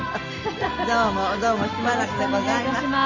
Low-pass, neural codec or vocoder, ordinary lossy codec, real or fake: 7.2 kHz; none; Opus, 32 kbps; real